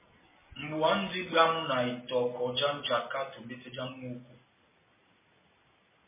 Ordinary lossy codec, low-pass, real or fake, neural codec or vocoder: MP3, 16 kbps; 3.6 kHz; real; none